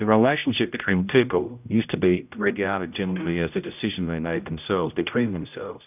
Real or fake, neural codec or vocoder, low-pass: fake; codec, 16 kHz, 0.5 kbps, X-Codec, HuBERT features, trained on general audio; 3.6 kHz